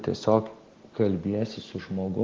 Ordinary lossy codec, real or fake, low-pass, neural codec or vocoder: Opus, 16 kbps; real; 7.2 kHz; none